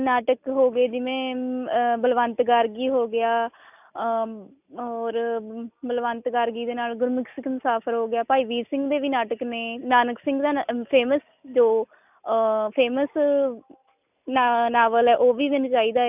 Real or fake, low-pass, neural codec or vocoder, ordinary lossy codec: real; 3.6 kHz; none; none